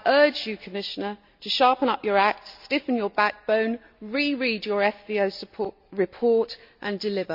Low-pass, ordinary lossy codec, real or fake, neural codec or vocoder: 5.4 kHz; MP3, 48 kbps; real; none